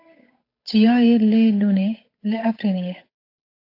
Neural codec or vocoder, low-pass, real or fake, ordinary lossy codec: codec, 16 kHz, 8 kbps, FunCodec, trained on Chinese and English, 25 frames a second; 5.4 kHz; fake; AAC, 32 kbps